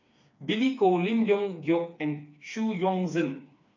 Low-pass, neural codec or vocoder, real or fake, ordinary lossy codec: 7.2 kHz; codec, 16 kHz, 4 kbps, FreqCodec, smaller model; fake; none